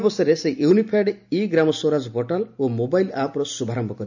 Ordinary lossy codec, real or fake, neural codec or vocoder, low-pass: none; real; none; 7.2 kHz